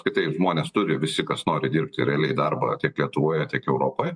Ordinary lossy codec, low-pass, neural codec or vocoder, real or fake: MP3, 64 kbps; 9.9 kHz; vocoder, 44.1 kHz, 128 mel bands every 512 samples, BigVGAN v2; fake